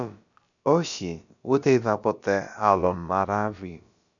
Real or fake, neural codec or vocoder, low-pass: fake; codec, 16 kHz, about 1 kbps, DyCAST, with the encoder's durations; 7.2 kHz